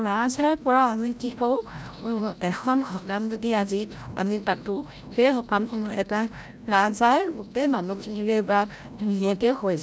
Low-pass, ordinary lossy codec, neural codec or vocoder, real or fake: none; none; codec, 16 kHz, 0.5 kbps, FreqCodec, larger model; fake